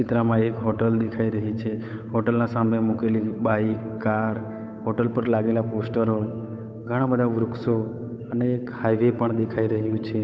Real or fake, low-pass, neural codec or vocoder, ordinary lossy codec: fake; none; codec, 16 kHz, 8 kbps, FunCodec, trained on Chinese and English, 25 frames a second; none